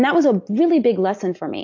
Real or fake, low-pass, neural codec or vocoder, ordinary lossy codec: real; 7.2 kHz; none; AAC, 48 kbps